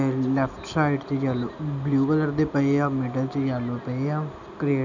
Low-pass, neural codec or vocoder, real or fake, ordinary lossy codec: 7.2 kHz; none; real; none